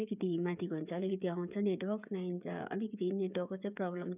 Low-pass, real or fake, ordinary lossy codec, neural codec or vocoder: 3.6 kHz; fake; none; codec, 16 kHz, 8 kbps, FreqCodec, smaller model